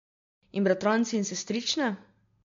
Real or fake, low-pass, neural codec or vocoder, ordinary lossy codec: real; 7.2 kHz; none; MP3, 48 kbps